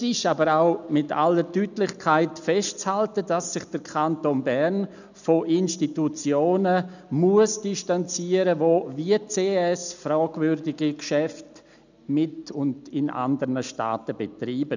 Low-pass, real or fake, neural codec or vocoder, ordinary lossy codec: 7.2 kHz; real; none; none